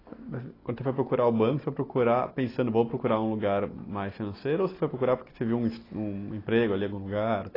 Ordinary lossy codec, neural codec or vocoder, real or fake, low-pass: AAC, 24 kbps; none; real; 5.4 kHz